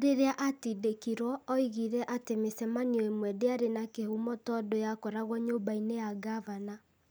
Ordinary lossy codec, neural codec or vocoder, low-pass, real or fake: none; none; none; real